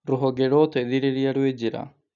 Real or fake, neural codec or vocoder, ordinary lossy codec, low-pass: real; none; none; 7.2 kHz